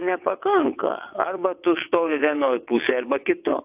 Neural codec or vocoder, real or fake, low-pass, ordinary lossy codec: vocoder, 22.05 kHz, 80 mel bands, WaveNeXt; fake; 3.6 kHz; AAC, 32 kbps